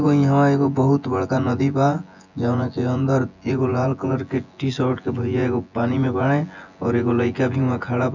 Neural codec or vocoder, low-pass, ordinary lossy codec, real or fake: vocoder, 24 kHz, 100 mel bands, Vocos; 7.2 kHz; none; fake